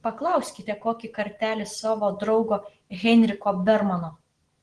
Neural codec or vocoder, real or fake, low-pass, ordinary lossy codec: none; real; 9.9 kHz; Opus, 16 kbps